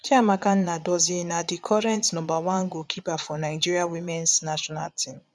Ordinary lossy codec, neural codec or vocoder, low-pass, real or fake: none; vocoder, 22.05 kHz, 80 mel bands, Vocos; none; fake